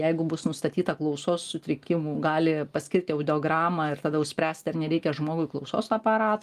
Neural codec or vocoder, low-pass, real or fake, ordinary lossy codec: none; 14.4 kHz; real; Opus, 32 kbps